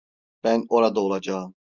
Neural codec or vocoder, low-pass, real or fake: none; 7.2 kHz; real